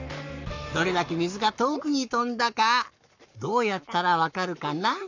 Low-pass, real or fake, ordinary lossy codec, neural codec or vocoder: 7.2 kHz; fake; none; codec, 44.1 kHz, 7.8 kbps, Pupu-Codec